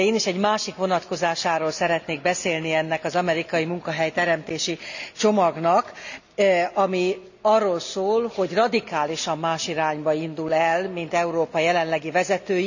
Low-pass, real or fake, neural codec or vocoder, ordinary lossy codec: 7.2 kHz; real; none; none